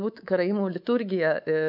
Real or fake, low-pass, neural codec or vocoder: fake; 5.4 kHz; codec, 24 kHz, 3.1 kbps, DualCodec